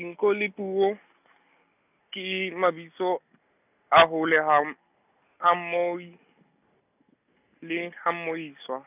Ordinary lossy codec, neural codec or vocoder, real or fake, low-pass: none; none; real; 3.6 kHz